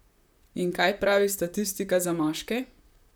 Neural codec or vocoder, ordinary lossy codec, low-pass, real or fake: vocoder, 44.1 kHz, 128 mel bands, Pupu-Vocoder; none; none; fake